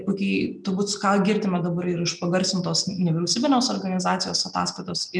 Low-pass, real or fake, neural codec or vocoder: 9.9 kHz; real; none